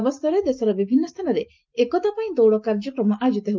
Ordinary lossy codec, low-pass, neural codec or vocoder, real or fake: Opus, 24 kbps; 7.2 kHz; none; real